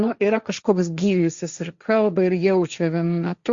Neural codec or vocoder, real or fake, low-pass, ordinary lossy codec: codec, 16 kHz, 1.1 kbps, Voila-Tokenizer; fake; 7.2 kHz; Opus, 64 kbps